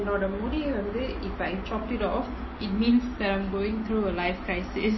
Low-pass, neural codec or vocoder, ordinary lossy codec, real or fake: 7.2 kHz; none; MP3, 24 kbps; real